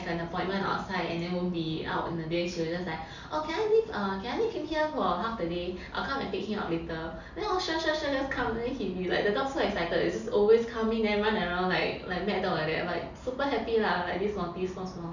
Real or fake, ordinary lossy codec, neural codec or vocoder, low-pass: real; none; none; 7.2 kHz